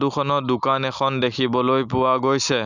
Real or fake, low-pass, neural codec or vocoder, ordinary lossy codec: real; 7.2 kHz; none; none